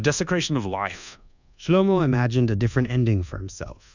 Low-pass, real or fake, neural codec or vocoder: 7.2 kHz; fake; codec, 24 kHz, 1.2 kbps, DualCodec